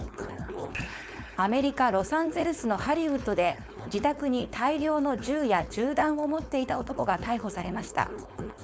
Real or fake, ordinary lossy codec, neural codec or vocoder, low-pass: fake; none; codec, 16 kHz, 4.8 kbps, FACodec; none